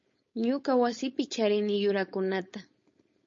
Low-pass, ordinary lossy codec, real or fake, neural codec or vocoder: 7.2 kHz; MP3, 32 kbps; fake; codec, 16 kHz, 4.8 kbps, FACodec